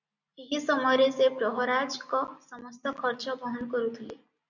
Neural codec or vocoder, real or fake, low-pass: none; real; 7.2 kHz